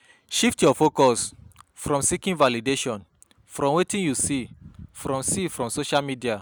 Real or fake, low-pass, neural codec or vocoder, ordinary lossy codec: real; none; none; none